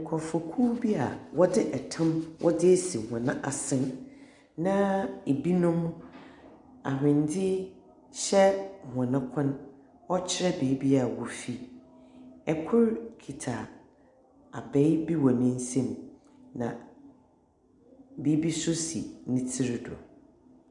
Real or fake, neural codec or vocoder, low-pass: real; none; 10.8 kHz